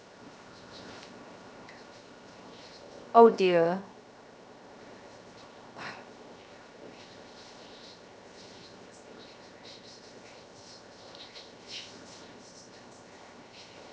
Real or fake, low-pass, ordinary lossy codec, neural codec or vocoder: fake; none; none; codec, 16 kHz, 0.7 kbps, FocalCodec